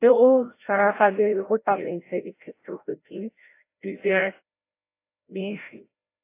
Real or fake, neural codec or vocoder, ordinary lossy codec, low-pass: fake; codec, 16 kHz, 0.5 kbps, FreqCodec, larger model; AAC, 24 kbps; 3.6 kHz